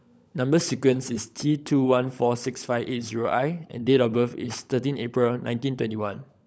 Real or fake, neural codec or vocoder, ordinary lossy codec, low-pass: fake; codec, 16 kHz, 16 kbps, FunCodec, trained on LibriTTS, 50 frames a second; none; none